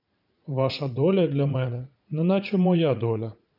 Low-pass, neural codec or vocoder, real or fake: 5.4 kHz; vocoder, 44.1 kHz, 80 mel bands, Vocos; fake